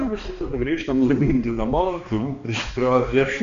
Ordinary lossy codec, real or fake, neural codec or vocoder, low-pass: MP3, 48 kbps; fake; codec, 16 kHz, 2 kbps, X-Codec, HuBERT features, trained on balanced general audio; 7.2 kHz